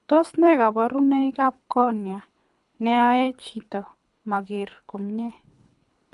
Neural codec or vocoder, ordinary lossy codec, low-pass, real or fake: codec, 24 kHz, 3 kbps, HILCodec; none; 10.8 kHz; fake